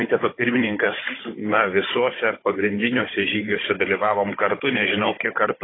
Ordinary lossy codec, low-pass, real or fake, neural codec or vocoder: AAC, 16 kbps; 7.2 kHz; fake; codec, 16 kHz, 16 kbps, FunCodec, trained on Chinese and English, 50 frames a second